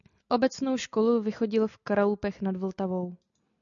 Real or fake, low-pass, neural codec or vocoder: real; 7.2 kHz; none